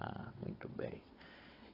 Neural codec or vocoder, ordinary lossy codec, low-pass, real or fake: vocoder, 22.05 kHz, 80 mel bands, WaveNeXt; AAC, 24 kbps; 5.4 kHz; fake